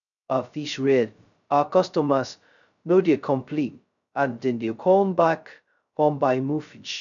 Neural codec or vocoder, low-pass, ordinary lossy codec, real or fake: codec, 16 kHz, 0.2 kbps, FocalCodec; 7.2 kHz; none; fake